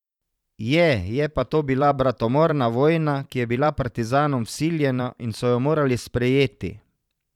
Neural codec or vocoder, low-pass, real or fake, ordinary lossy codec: vocoder, 44.1 kHz, 128 mel bands every 512 samples, BigVGAN v2; 19.8 kHz; fake; none